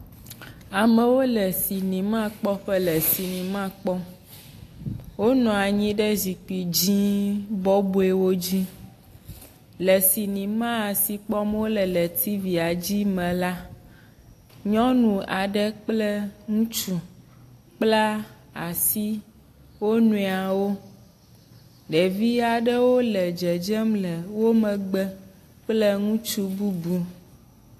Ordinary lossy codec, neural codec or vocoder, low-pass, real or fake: AAC, 64 kbps; none; 14.4 kHz; real